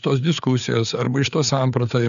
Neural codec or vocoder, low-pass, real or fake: codec, 16 kHz, 4 kbps, FreqCodec, larger model; 7.2 kHz; fake